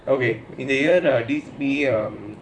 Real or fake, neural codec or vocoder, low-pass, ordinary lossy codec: fake; vocoder, 44.1 kHz, 128 mel bands, Pupu-Vocoder; 9.9 kHz; none